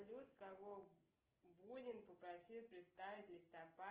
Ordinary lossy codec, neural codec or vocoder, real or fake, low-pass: Opus, 16 kbps; none; real; 3.6 kHz